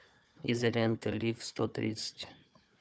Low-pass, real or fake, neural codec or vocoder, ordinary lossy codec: none; fake; codec, 16 kHz, 4 kbps, FunCodec, trained on Chinese and English, 50 frames a second; none